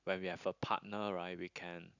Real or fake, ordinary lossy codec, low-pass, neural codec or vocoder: real; none; 7.2 kHz; none